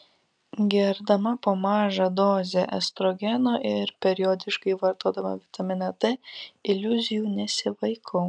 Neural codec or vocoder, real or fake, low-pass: none; real; 9.9 kHz